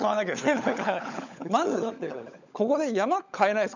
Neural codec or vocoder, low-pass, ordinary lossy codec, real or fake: codec, 16 kHz, 16 kbps, FunCodec, trained on LibriTTS, 50 frames a second; 7.2 kHz; none; fake